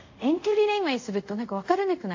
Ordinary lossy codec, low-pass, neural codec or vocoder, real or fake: AAC, 48 kbps; 7.2 kHz; codec, 24 kHz, 0.5 kbps, DualCodec; fake